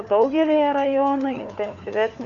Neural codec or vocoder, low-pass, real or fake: codec, 16 kHz, 4 kbps, FunCodec, trained on LibriTTS, 50 frames a second; 7.2 kHz; fake